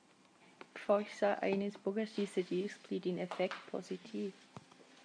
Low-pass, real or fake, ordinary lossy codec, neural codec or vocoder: 9.9 kHz; real; MP3, 96 kbps; none